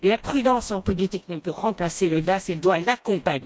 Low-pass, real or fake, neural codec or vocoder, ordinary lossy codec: none; fake; codec, 16 kHz, 1 kbps, FreqCodec, smaller model; none